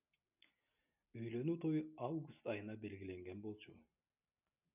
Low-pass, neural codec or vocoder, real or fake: 3.6 kHz; none; real